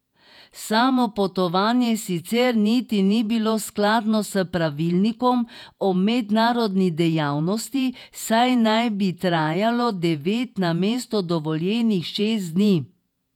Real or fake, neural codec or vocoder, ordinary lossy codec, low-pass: fake; vocoder, 48 kHz, 128 mel bands, Vocos; none; 19.8 kHz